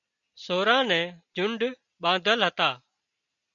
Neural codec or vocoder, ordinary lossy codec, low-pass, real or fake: none; MP3, 96 kbps; 7.2 kHz; real